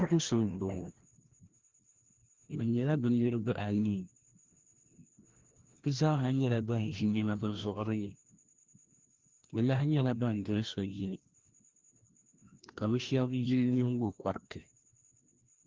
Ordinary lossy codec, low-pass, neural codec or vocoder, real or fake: Opus, 16 kbps; 7.2 kHz; codec, 16 kHz, 1 kbps, FreqCodec, larger model; fake